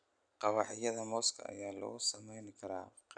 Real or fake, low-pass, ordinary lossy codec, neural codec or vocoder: real; 9.9 kHz; none; none